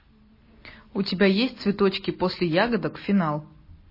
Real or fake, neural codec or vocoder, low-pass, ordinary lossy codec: real; none; 5.4 kHz; MP3, 24 kbps